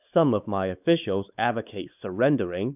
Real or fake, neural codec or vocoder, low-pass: fake; codec, 16 kHz, 4 kbps, X-Codec, WavLM features, trained on Multilingual LibriSpeech; 3.6 kHz